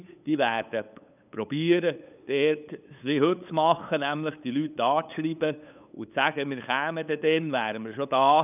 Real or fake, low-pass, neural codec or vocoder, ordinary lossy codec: fake; 3.6 kHz; codec, 16 kHz, 8 kbps, FunCodec, trained on LibriTTS, 25 frames a second; none